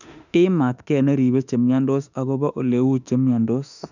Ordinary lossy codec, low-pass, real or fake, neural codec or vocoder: none; 7.2 kHz; fake; autoencoder, 48 kHz, 32 numbers a frame, DAC-VAE, trained on Japanese speech